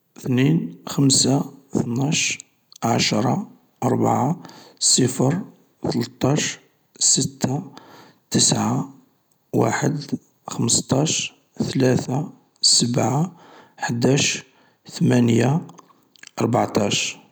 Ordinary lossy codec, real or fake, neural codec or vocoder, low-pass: none; real; none; none